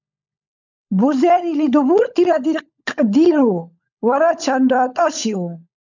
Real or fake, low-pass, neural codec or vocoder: fake; 7.2 kHz; codec, 16 kHz, 16 kbps, FunCodec, trained on LibriTTS, 50 frames a second